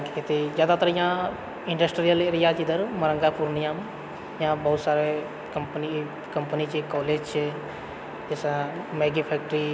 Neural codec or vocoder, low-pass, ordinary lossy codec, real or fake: none; none; none; real